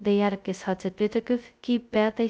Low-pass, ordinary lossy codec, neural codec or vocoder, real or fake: none; none; codec, 16 kHz, 0.2 kbps, FocalCodec; fake